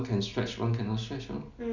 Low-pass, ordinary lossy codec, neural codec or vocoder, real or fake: 7.2 kHz; none; none; real